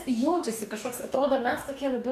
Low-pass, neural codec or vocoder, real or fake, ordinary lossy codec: 14.4 kHz; codec, 44.1 kHz, 2.6 kbps, DAC; fake; MP3, 96 kbps